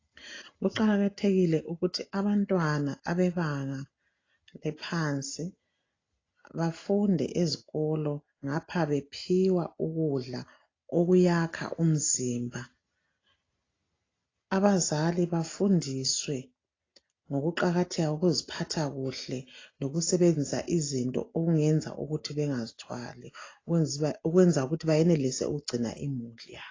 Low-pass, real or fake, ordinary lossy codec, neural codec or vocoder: 7.2 kHz; real; AAC, 32 kbps; none